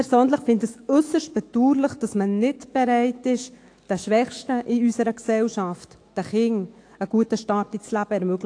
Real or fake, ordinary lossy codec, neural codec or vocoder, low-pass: fake; AAC, 48 kbps; autoencoder, 48 kHz, 128 numbers a frame, DAC-VAE, trained on Japanese speech; 9.9 kHz